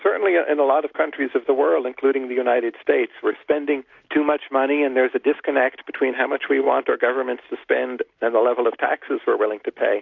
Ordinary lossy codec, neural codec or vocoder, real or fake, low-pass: AAC, 48 kbps; none; real; 7.2 kHz